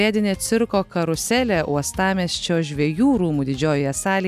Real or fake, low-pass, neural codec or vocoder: real; 14.4 kHz; none